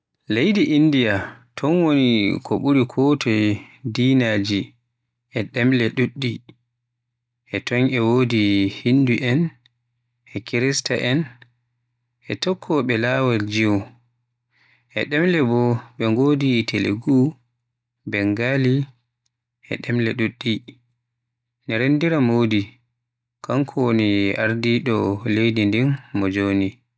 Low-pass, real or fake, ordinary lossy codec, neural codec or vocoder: none; real; none; none